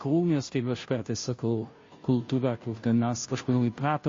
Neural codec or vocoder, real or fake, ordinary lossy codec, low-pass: codec, 16 kHz, 0.5 kbps, FunCodec, trained on Chinese and English, 25 frames a second; fake; MP3, 32 kbps; 7.2 kHz